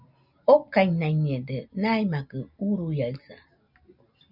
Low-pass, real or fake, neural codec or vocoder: 5.4 kHz; real; none